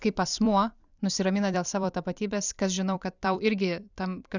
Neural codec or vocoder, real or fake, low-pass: vocoder, 44.1 kHz, 128 mel bands every 256 samples, BigVGAN v2; fake; 7.2 kHz